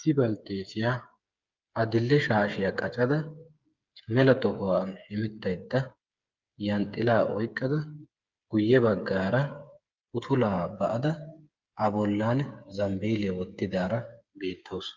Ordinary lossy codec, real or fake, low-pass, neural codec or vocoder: Opus, 32 kbps; fake; 7.2 kHz; codec, 16 kHz, 8 kbps, FreqCodec, smaller model